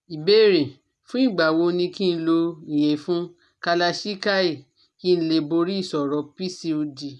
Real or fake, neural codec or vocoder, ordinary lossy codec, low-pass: real; none; none; none